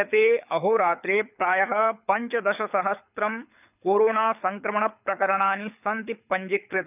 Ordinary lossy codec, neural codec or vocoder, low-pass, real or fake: none; codec, 24 kHz, 6 kbps, HILCodec; 3.6 kHz; fake